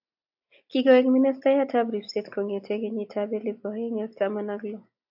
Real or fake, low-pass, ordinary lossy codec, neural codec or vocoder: real; 5.4 kHz; AAC, 48 kbps; none